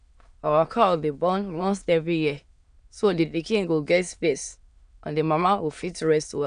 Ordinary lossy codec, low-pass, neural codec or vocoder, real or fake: none; 9.9 kHz; autoencoder, 22.05 kHz, a latent of 192 numbers a frame, VITS, trained on many speakers; fake